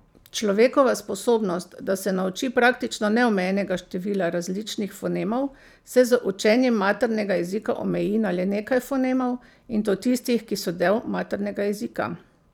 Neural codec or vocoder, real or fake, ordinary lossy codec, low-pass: vocoder, 44.1 kHz, 128 mel bands every 512 samples, BigVGAN v2; fake; none; 19.8 kHz